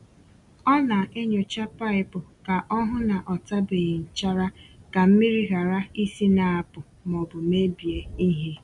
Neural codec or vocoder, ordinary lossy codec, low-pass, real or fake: none; none; 10.8 kHz; real